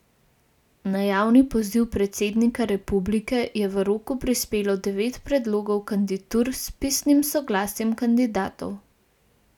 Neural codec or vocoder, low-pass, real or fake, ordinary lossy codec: none; 19.8 kHz; real; none